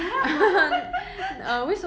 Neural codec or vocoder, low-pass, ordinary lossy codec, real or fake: none; none; none; real